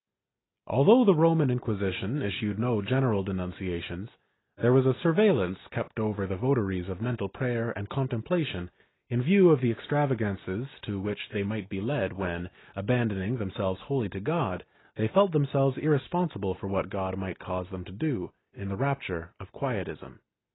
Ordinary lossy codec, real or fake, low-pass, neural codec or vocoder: AAC, 16 kbps; real; 7.2 kHz; none